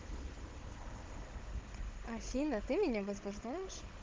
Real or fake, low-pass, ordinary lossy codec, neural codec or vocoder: fake; 7.2 kHz; Opus, 16 kbps; codec, 16 kHz, 16 kbps, FunCodec, trained on Chinese and English, 50 frames a second